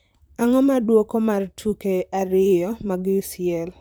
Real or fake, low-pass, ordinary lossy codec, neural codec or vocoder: fake; none; none; vocoder, 44.1 kHz, 128 mel bands, Pupu-Vocoder